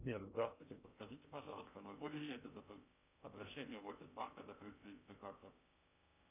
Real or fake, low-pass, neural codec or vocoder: fake; 3.6 kHz; codec, 16 kHz in and 24 kHz out, 0.8 kbps, FocalCodec, streaming, 65536 codes